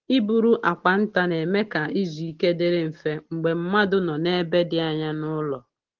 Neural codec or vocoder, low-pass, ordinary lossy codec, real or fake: none; 7.2 kHz; Opus, 16 kbps; real